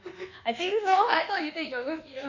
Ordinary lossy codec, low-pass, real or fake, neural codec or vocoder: none; 7.2 kHz; fake; codec, 24 kHz, 1.2 kbps, DualCodec